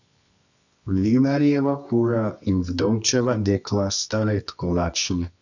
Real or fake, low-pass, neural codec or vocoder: fake; 7.2 kHz; codec, 24 kHz, 0.9 kbps, WavTokenizer, medium music audio release